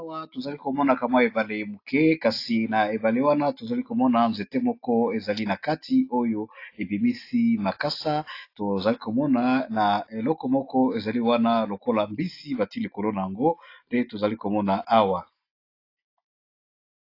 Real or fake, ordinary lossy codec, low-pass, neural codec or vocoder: real; AAC, 32 kbps; 5.4 kHz; none